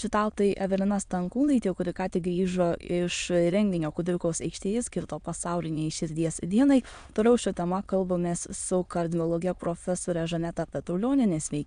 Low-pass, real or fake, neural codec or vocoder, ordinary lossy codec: 9.9 kHz; fake; autoencoder, 22.05 kHz, a latent of 192 numbers a frame, VITS, trained on many speakers; AAC, 96 kbps